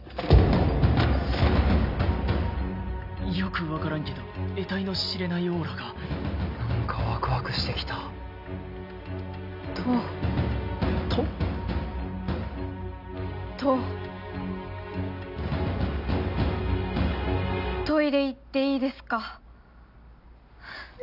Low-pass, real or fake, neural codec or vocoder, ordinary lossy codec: 5.4 kHz; real; none; none